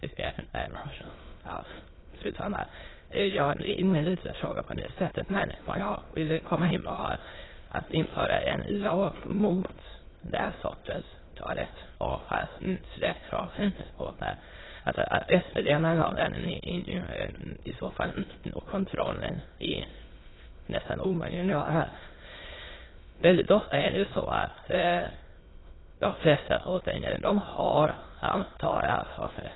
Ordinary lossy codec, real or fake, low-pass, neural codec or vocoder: AAC, 16 kbps; fake; 7.2 kHz; autoencoder, 22.05 kHz, a latent of 192 numbers a frame, VITS, trained on many speakers